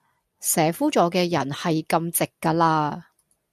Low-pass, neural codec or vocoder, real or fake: 14.4 kHz; none; real